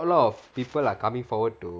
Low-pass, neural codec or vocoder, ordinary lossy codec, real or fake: none; none; none; real